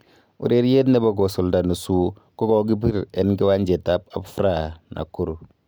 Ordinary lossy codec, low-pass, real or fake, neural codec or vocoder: none; none; real; none